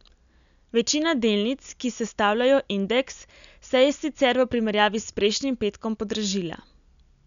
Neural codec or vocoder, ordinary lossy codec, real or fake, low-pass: none; none; real; 7.2 kHz